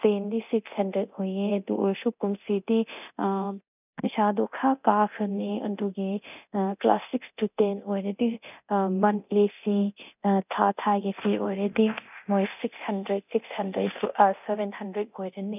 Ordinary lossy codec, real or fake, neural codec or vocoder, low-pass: none; fake; codec, 24 kHz, 0.9 kbps, DualCodec; 3.6 kHz